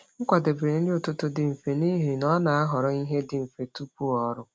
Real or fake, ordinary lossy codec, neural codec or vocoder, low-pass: real; none; none; none